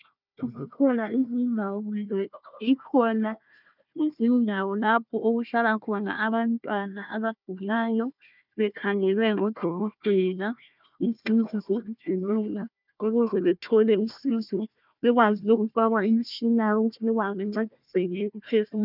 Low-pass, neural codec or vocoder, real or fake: 5.4 kHz; codec, 16 kHz, 1 kbps, FunCodec, trained on Chinese and English, 50 frames a second; fake